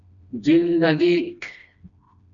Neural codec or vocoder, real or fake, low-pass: codec, 16 kHz, 1 kbps, FreqCodec, smaller model; fake; 7.2 kHz